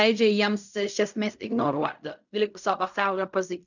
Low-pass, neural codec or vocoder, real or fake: 7.2 kHz; codec, 16 kHz in and 24 kHz out, 0.4 kbps, LongCat-Audio-Codec, fine tuned four codebook decoder; fake